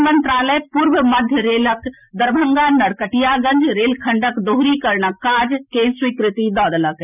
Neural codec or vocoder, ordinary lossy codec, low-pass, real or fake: none; none; 3.6 kHz; real